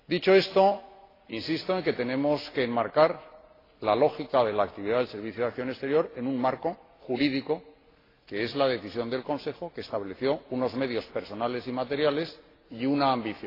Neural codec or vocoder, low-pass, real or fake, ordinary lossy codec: none; 5.4 kHz; real; AAC, 24 kbps